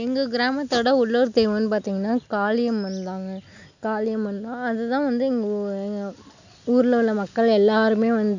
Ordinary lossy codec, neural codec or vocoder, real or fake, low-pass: none; none; real; 7.2 kHz